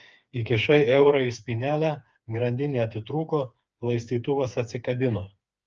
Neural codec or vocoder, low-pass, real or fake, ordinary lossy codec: codec, 16 kHz, 4 kbps, FreqCodec, smaller model; 7.2 kHz; fake; Opus, 24 kbps